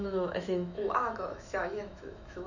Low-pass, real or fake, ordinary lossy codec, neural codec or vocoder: 7.2 kHz; fake; none; autoencoder, 48 kHz, 128 numbers a frame, DAC-VAE, trained on Japanese speech